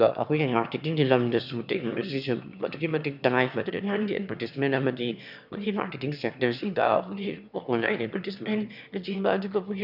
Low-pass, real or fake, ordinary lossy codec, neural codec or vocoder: 5.4 kHz; fake; none; autoencoder, 22.05 kHz, a latent of 192 numbers a frame, VITS, trained on one speaker